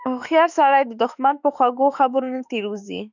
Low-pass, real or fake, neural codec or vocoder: 7.2 kHz; fake; autoencoder, 48 kHz, 32 numbers a frame, DAC-VAE, trained on Japanese speech